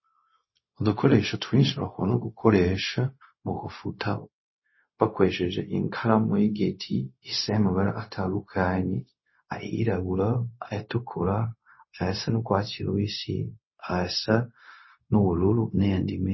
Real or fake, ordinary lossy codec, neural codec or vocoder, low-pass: fake; MP3, 24 kbps; codec, 16 kHz, 0.4 kbps, LongCat-Audio-Codec; 7.2 kHz